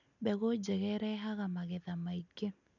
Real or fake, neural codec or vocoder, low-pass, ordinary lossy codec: real; none; 7.2 kHz; none